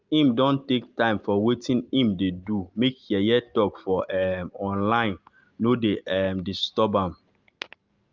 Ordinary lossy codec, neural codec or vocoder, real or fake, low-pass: Opus, 24 kbps; none; real; 7.2 kHz